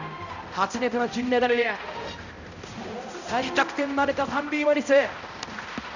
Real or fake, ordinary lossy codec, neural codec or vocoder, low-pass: fake; none; codec, 16 kHz, 0.5 kbps, X-Codec, HuBERT features, trained on balanced general audio; 7.2 kHz